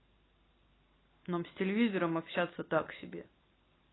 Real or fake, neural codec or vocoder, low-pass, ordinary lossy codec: real; none; 7.2 kHz; AAC, 16 kbps